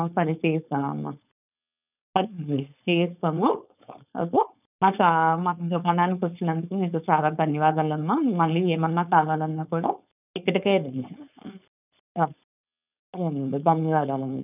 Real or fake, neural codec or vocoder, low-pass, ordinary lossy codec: fake; codec, 16 kHz, 4.8 kbps, FACodec; 3.6 kHz; none